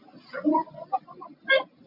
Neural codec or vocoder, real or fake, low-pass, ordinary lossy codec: none; real; 5.4 kHz; MP3, 32 kbps